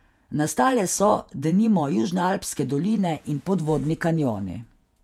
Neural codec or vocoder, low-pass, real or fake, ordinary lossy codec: vocoder, 48 kHz, 128 mel bands, Vocos; 19.8 kHz; fake; MP3, 96 kbps